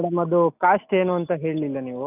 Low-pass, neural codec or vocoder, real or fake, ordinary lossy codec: 3.6 kHz; none; real; none